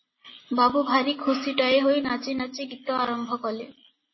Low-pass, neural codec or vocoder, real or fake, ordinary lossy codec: 7.2 kHz; none; real; MP3, 24 kbps